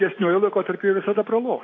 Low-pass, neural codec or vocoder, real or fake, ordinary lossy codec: 7.2 kHz; none; real; AAC, 32 kbps